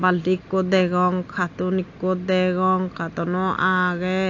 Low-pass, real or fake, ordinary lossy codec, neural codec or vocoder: 7.2 kHz; real; AAC, 48 kbps; none